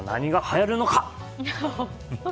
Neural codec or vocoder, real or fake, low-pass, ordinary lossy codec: none; real; none; none